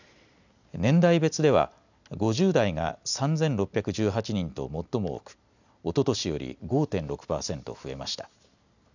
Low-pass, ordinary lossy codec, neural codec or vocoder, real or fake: 7.2 kHz; none; none; real